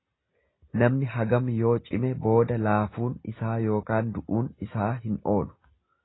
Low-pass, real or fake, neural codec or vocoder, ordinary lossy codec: 7.2 kHz; real; none; AAC, 16 kbps